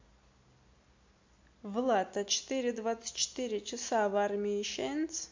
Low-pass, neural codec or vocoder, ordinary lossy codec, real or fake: 7.2 kHz; none; MP3, 48 kbps; real